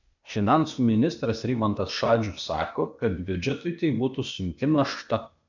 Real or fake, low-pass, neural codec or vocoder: fake; 7.2 kHz; codec, 16 kHz, 0.8 kbps, ZipCodec